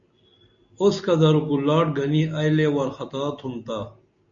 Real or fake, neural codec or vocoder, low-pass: real; none; 7.2 kHz